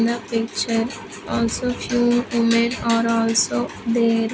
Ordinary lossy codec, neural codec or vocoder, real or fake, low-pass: none; none; real; none